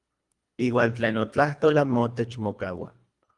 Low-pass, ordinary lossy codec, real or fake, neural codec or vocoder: 10.8 kHz; Opus, 32 kbps; fake; codec, 24 kHz, 1.5 kbps, HILCodec